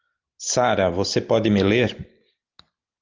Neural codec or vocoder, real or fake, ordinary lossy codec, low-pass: none; real; Opus, 32 kbps; 7.2 kHz